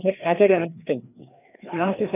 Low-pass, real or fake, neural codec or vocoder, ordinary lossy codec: 3.6 kHz; fake; codec, 16 kHz, 2 kbps, FreqCodec, larger model; none